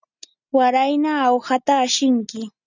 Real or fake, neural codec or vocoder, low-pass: real; none; 7.2 kHz